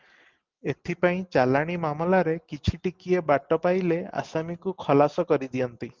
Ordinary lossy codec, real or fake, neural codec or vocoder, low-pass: Opus, 24 kbps; real; none; 7.2 kHz